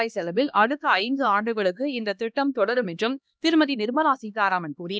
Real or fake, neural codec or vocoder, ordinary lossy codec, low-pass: fake; codec, 16 kHz, 1 kbps, X-Codec, HuBERT features, trained on LibriSpeech; none; none